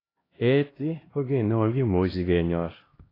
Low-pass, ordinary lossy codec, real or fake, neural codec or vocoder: 5.4 kHz; AAC, 24 kbps; fake; codec, 16 kHz, 1 kbps, X-Codec, HuBERT features, trained on LibriSpeech